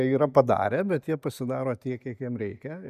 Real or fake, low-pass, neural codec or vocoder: real; 14.4 kHz; none